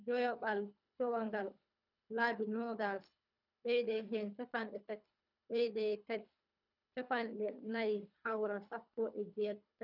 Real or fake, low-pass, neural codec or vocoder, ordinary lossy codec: fake; 5.4 kHz; codec, 24 kHz, 3 kbps, HILCodec; none